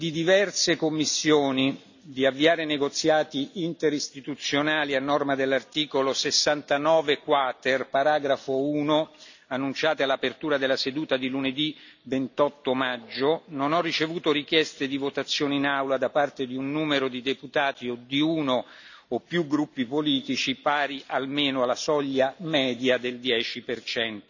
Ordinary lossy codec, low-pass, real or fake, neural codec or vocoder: none; 7.2 kHz; real; none